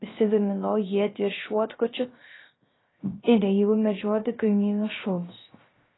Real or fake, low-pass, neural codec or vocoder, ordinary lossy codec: fake; 7.2 kHz; codec, 16 kHz, 0.3 kbps, FocalCodec; AAC, 16 kbps